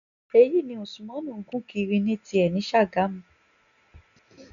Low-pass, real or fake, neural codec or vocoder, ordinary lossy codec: 7.2 kHz; real; none; none